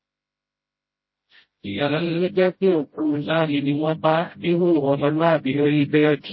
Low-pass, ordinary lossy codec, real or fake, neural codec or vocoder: 7.2 kHz; MP3, 24 kbps; fake; codec, 16 kHz, 0.5 kbps, FreqCodec, smaller model